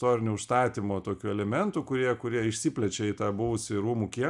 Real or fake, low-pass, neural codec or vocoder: real; 10.8 kHz; none